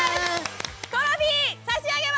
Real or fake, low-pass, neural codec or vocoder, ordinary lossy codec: real; none; none; none